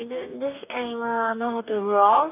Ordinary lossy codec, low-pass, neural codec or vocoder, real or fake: none; 3.6 kHz; codec, 44.1 kHz, 2.6 kbps, DAC; fake